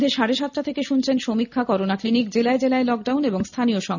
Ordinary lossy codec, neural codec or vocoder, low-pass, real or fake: none; none; 7.2 kHz; real